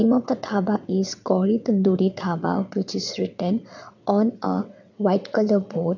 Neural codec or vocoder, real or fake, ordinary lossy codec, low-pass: vocoder, 22.05 kHz, 80 mel bands, WaveNeXt; fake; none; 7.2 kHz